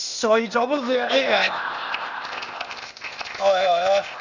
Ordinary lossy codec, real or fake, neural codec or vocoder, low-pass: none; fake; codec, 16 kHz, 0.8 kbps, ZipCodec; 7.2 kHz